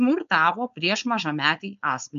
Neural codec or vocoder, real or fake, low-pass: codec, 16 kHz, 4.8 kbps, FACodec; fake; 7.2 kHz